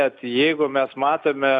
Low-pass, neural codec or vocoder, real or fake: 10.8 kHz; none; real